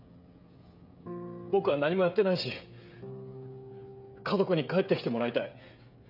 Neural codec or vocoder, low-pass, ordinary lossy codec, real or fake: codec, 16 kHz, 16 kbps, FreqCodec, smaller model; 5.4 kHz; none; fake